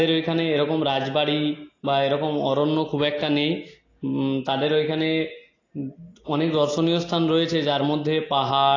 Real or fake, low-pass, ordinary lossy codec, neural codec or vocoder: real; 7.2 kHz; AAC, 32 kbps; none